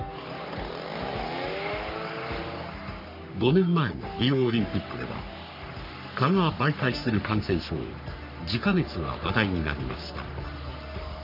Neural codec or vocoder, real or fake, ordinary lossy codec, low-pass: codec, 44.1 kHz, 3.4 kbps, Pupu-Codec; fake; none; 5.4 kHz